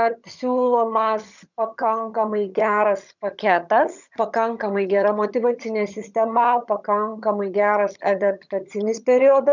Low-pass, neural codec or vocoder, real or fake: 7.2 kHz; vocoder, 22.05 kHz, 80 mel bands, HiFi-GAN; fake